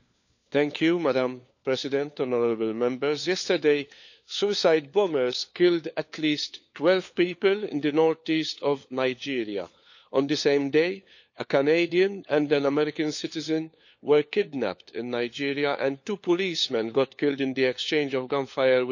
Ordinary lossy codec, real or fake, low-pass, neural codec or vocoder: none; fake; 7.2 kHz; codec, 16 kHz, 4 kbps, FunCodec, trained on LibriTTS, 50 frames a second